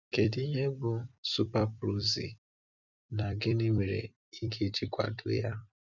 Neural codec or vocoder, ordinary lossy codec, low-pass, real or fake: vocoder, 44.1 kHz, 128 mel bands every 256 samples, BigVGAN v2; none; 7.2 kHz; fake